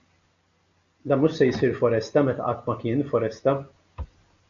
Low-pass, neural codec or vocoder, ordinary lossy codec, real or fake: 7.2 kHz; none; MP3, 64 kbps; real